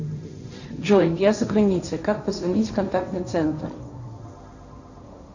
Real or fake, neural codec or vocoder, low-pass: fake; codec, 16 kHz, 1.1 kbps, Voila-Tokenizer; 7.2 kHz